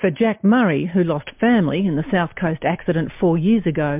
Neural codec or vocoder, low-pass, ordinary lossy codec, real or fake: none; 3.6 kHz; MP3, 32 kbps; real